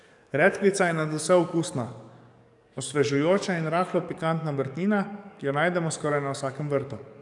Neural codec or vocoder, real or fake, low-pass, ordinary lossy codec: codec, 44.1 kHz, 7.8 kbps, DAC; fake; 10.8 kHz; none